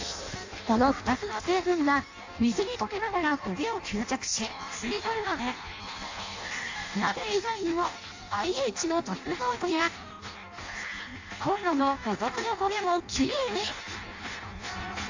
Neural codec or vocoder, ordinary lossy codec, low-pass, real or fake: codec, 16 kHz in and 24 kHz out, 0.6 kbps, FireRedTTS-2 codec; AAC, 48 kbps; 7.2 kHz; fake